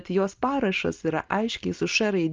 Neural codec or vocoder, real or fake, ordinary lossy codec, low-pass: none; real; Opus, 24 kbps; 7.2 kHz